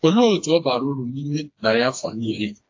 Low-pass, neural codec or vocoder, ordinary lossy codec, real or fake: 7.2 kHz; codec, 16 kHz, 2 kbps, FreqCodec, smaller model; AAC, 48 kbps; fake